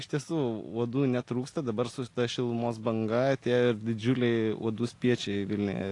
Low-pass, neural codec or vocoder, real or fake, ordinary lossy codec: 10.8 kHz; none; real; AAC, 48 kbps